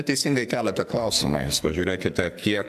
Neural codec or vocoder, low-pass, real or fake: codec, 44.1 kHz, 2.6 kbps, SNAC; 14.4 kHz; fake